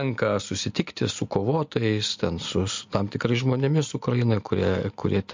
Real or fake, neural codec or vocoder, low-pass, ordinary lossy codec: real; none; 7.2 kHz; MP3, 48 kbps